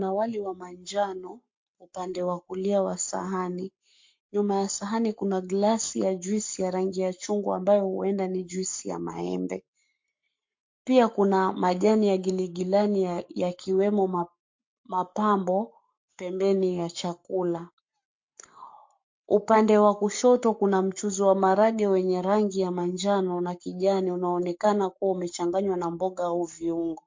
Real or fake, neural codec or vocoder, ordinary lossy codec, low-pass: fake; codec, 44.1 kHz, 7.8 kbps, Pupu-Codec; MP3, 48 kbps; 7.2 kHz